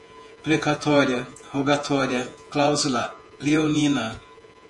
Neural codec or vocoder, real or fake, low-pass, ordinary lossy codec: vocoder, 48 kHz, 128 mel bands, Vocos; fake; 10.8 kHz; MP3, 48 kbps